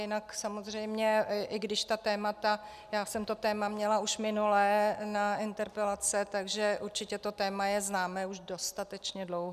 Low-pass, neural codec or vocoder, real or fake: 14.4 kHz; none; real